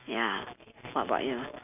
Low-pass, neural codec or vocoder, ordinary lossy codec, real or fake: 3.6 kHz; none; none; real